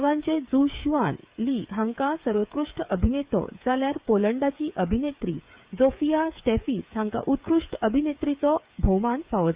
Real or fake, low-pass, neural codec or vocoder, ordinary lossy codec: fake; 3.6 kHz; codec, 16 kHz, 8 kbps, FreqCodec, smaller model; Opus, 64 kbps